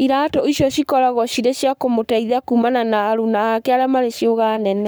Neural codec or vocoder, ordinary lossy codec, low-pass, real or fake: codec, 44.1 kHz, 7.8 kbps, Pupu-Codec; none; none; fake